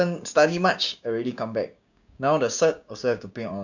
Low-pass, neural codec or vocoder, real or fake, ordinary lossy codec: 7.2 kHz; codec, 16 kHz, 6 kbps, DAC; fake; none